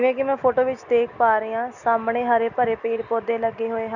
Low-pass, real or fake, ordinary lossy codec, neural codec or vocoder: 7.2 kHz; real; none; none